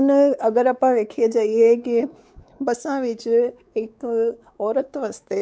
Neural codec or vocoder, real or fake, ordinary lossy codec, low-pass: codec, 16 kHz, 4 kbps, X-Codec, WavLM features, trained on Multilingual LibriSpeech; fake; none; none